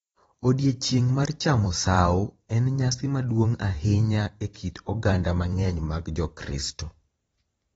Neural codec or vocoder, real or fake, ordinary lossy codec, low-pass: vocoder, 44.1 kHz, 128 mel bands every 512 samples, BigVGAN v2; fake; AAC, 24 kbps; 19.8 kHz